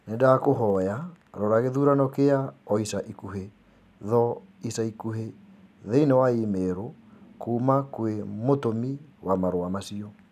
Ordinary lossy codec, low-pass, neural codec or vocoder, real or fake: none; 14.4 kHz; none; real